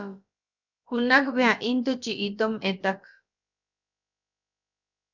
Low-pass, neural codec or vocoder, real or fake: 7.2 kHz; codec, 16 kHz, about 1 kbps, DyCAST, with the encoder's durations; fake